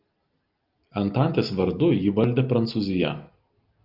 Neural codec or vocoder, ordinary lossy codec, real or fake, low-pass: none; Opus, 24 kbps; real; 5.4 kHz